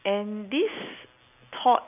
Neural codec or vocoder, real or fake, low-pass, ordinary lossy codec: none; real; 3.6 kHz; none